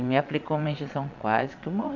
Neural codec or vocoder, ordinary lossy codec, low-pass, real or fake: vocoder, 22.05 kHz, 80 mel bands, Vocos; none; 7.2 kHz; fake